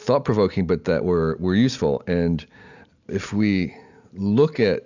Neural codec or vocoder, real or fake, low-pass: none; real; 7.2 kHz